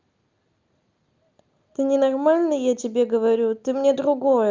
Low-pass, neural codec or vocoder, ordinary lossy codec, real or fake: 7.2 kHz; none; Opus, 24 kbps; real